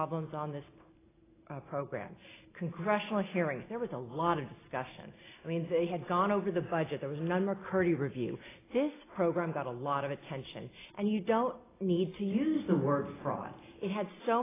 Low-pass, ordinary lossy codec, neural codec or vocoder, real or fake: 3.6 kHz; AAC, 16 kbps; none; real